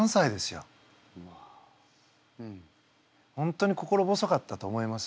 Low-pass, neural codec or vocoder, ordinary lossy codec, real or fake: none; none; none; real